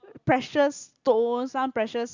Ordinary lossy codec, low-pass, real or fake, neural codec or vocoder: Opus, 64 kbps; 7.2 kHz; real; none